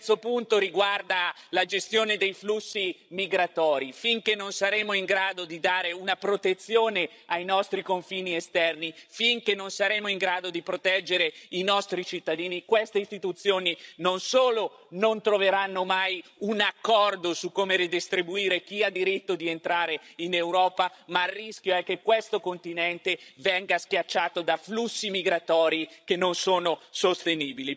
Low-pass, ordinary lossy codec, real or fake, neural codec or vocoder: none; none; fake; codec, 16 kHz, 16 kbps, FreqCodec, larger model